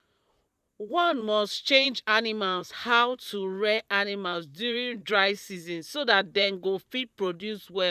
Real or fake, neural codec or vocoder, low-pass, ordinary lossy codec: fake; vocoder, 44.1 kHz, 128 mel bands, Pupu-Vocoder; 14.4 kHz; none